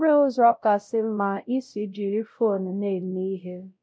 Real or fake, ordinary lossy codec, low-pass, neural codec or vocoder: fake; none; none; codec, 16 kHz, 0.5 kbps, X-Codec, WavLM features, trained on Multilingual LibriSpeech